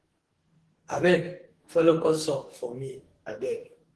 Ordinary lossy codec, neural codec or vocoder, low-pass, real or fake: Opus, 16 kbps; codec, 44.1 kHz, 2.6 kbps, DAC; 10.8 kHz; fake